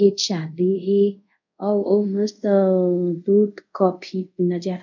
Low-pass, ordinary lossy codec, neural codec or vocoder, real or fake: 7.2 kHz; none; codec, 24 kHz, 0.5 kbps, DualCodec; fake